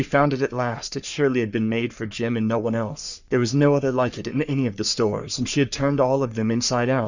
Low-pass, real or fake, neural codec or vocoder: 7.2 kHz; fake; codec, 44.1 kHz, 3.4 kbps, Pupu-Codec